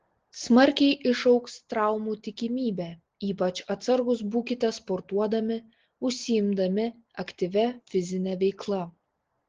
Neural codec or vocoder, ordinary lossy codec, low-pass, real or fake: none; Opus, 16 kbps; 7.2 kHz; real